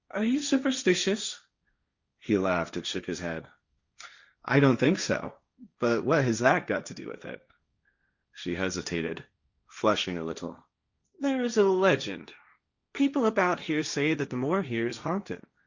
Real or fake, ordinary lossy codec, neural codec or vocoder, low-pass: fake; Opus, 64 kbps; codec, 16 kHz, 1.1 kbps, Voila-Tokenizer; 7.2 kHz